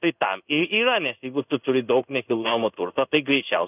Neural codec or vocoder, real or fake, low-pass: codec, 16 kHz in and 24 kHz out, 1 kbps, XY-Tokenizer; fake; 3.6 kHz